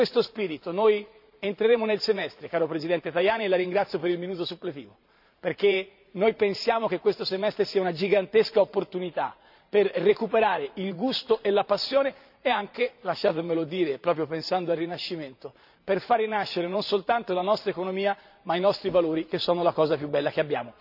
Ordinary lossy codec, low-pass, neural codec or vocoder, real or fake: none; 5.4 kHz; vocoder, 44.1 kHz, 128 mel bands every 512 samples, BigVGAN v2; fake